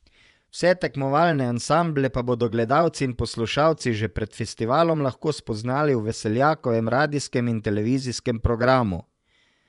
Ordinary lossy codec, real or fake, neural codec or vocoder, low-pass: none; fake; vocoder, 24 kHz, 100 mel bands, Vocos; 10.8 kHz